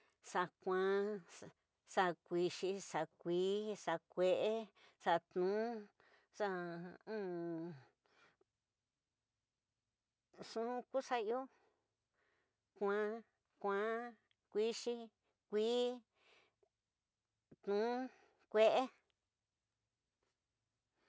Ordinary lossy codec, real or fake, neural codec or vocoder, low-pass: none; real; none; none